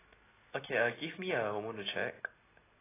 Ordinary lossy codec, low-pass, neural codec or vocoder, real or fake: AAC, 16 kbps; 3.6 kHz; none; real